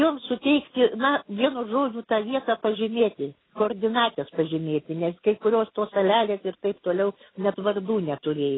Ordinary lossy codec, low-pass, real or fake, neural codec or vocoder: AAC, 16 kbps; 7.2 kHz; real; none